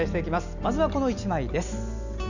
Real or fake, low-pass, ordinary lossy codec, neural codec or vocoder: real; 7.2 kHz; none; none